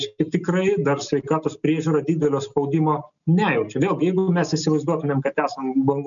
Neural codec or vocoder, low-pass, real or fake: none; 7.2 kHz; real